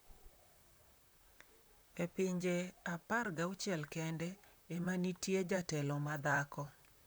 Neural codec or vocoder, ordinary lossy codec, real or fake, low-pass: vocoder, 44.1 kHz, 128 mel bands, Pupu-Vocoder; none; fake; none